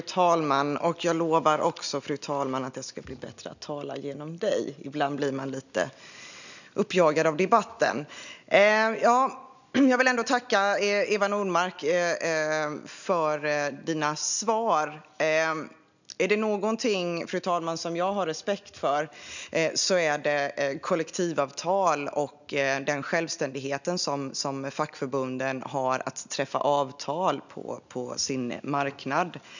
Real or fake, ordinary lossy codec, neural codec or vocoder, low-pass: real; none; none; 7.2 kHz